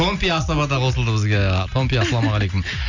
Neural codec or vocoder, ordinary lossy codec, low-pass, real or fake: none; none; 7.2 kHz; real